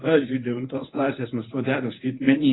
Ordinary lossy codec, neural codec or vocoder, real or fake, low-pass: AAC, 16 kbps; codec, 24 kHz, 0.9 kbps, WavTokenizer, medium speech release version 1; fake; 7.2 kHz